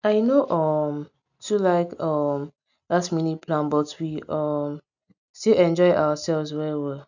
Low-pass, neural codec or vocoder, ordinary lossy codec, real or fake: 7.2 kHz; none; none; real